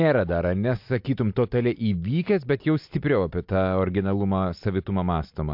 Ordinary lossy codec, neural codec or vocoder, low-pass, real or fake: MP3, 48 kbps; none; 5.4 kHz; real